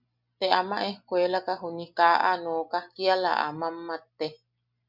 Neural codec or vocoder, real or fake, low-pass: none; real; 5.4 kHz